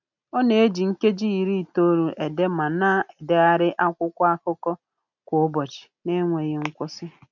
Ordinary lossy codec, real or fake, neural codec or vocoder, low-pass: none; real; none; 7.2 kHz